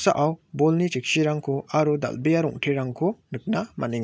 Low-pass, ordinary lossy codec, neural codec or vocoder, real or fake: none; none; none; real